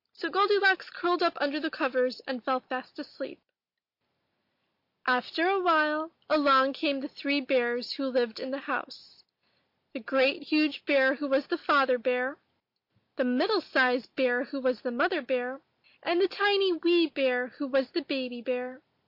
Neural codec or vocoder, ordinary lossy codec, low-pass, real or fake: none; MP3, 32 kbps; 5.4 kHz; real